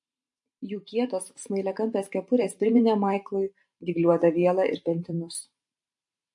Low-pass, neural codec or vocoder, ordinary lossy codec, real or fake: 10.8 kHz; vocoder, 44.1 kHz, 128 mel bands every 512 samples, BigVGAN v2; MP3, 48 kbps; fake